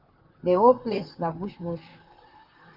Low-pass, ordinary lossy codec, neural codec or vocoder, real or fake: 5.4 kHz; Opus, 32 kbps; vocoder, 44.1 kHz, 128 mel bands, Pupu-Vocoder; fake